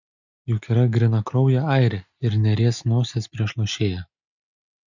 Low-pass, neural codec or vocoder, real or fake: 7.2 kHz; none; real